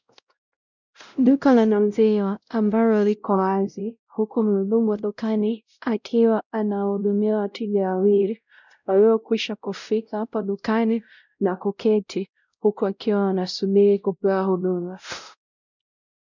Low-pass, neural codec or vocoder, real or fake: 7.2 kHz; codec, 16 kHz, 0.5 kbps, X-Codec, WavLM features, trained on Multilingual LibriSpeech; fake